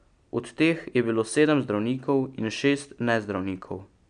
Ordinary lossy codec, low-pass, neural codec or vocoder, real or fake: none; 9.9 kHz; none; real